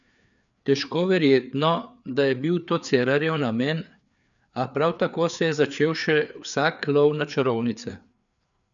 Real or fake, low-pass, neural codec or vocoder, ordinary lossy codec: fake; 7.2 kHz; codec, 16 kHz, 4 kbps, FreqCodec, larger model; none